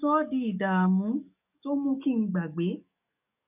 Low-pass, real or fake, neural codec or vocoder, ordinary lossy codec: 3.6 kHz; fake; codec, 44.1 kHz, 7.8 kbps, DAC; none